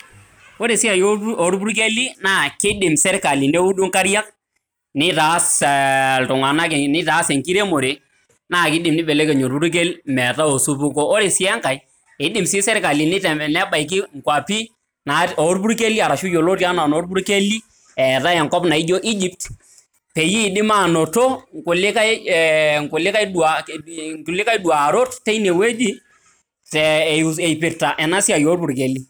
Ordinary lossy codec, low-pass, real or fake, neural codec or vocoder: none; none; real; none